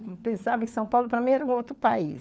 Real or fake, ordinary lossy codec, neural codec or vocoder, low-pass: fake; none; codec, 16 kHz, 4 kbps, FunCodec, trained on LibriTTS, 50 frames a second; none